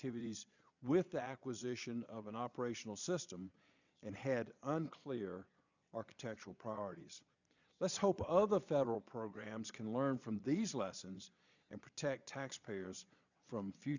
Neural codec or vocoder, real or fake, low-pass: vocoder, 22.05 kHz, 80 mel bands, Vocos; fake; 7.2 kHz